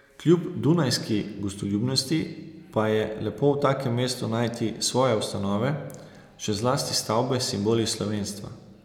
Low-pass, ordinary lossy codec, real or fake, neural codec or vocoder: 19.8 kHz; none; real; none